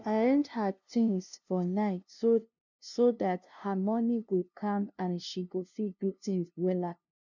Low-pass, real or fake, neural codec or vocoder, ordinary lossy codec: 7.2 kHz; fake; codec, 16 kHz, 0.5 kbps, FunCodec, trained on LibriTTS, 25 frames a second; none